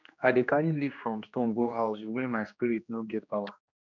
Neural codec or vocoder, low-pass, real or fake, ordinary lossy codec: codec, 16 kHz, 1 kbps, X-Codec, HuBERT features, trained on general audio; 7.2 kHz; fake; none